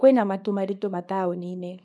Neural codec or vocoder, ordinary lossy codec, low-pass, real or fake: codec, 24 kHz, 0.9 kbps, WavTokenizer, small release; none; none; fake